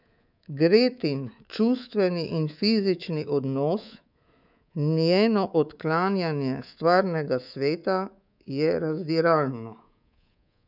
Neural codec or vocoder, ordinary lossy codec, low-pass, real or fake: codec, 24 kHz, 3.1 kbps, DualCodec; none; 5.4 kHz; fake